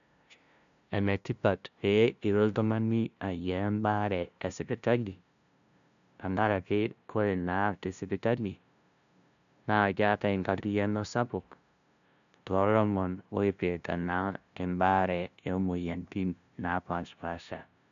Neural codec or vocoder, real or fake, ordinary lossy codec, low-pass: codec, 16 kHz, 0.5 kbps, FunCodec, trained on LibriTTS, 25 frames a second; fake; none; 7.2 kHz